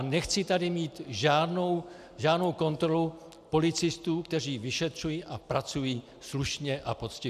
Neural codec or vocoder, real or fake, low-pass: none; real; 14.4 kHz